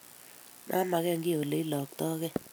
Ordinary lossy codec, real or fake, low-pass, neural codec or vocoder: none; real; none; none